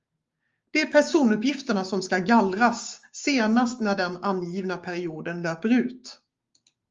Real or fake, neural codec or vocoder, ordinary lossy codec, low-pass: fake; codec, 16 kHz, 6 kbps, DAC; Opus, 32 kbps; 7.2 kHz